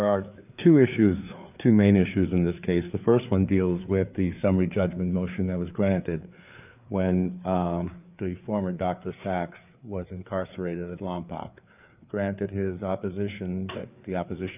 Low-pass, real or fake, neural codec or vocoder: 3.6 kHz; fake; codec, 16 kHz, 4 kbps, FreqCodec, larger model